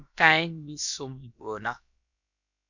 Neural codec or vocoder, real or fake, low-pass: codec, 16 kHz, about 1 kbps, DyCAST, with the encoder's durations; fake; 7.2 kHz